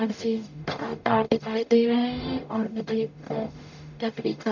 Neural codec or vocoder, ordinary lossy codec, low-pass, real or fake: codec, 44.1 kHz, 0.9 kbps, DAC; none; 7.2 kHz; fake